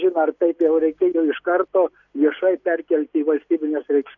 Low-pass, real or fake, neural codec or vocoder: 7.2 kHz; real; none